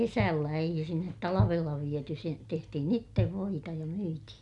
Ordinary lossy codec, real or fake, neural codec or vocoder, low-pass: none; real; none; 10.8 kHz